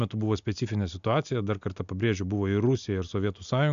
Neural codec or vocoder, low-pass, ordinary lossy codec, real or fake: none; 7.2 kHz; AAC, 96 kbps; real